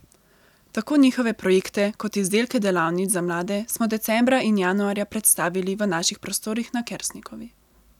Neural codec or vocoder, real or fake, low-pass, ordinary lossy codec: none; real; 19.8 kHz; none